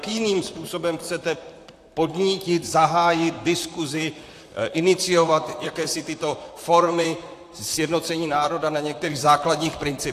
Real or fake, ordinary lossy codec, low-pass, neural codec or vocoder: fake; AAC, 64 kbps; 14.4 kHz; vocoder, 44.1 kHz, 128 mel bands, Pupu-Vocoder